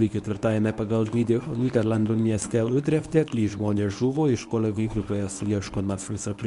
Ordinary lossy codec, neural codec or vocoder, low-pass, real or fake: MP3, 96 kbps; codec, 24 kHz, 0.9 kbps, WavTokenizer, medium speech release version 1; 10.8 kHz; fake